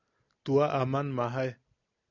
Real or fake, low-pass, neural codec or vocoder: real; 7.2 kHz; none